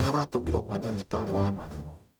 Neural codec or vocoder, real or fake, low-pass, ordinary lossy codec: codec, 44.1 kHz, 0.9 kbps, DAC; fake; none; none